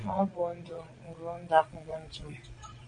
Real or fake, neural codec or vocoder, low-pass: fake; vocoder, 22.05 kHz, 80 mel bands, Vocos; 9.9 kHz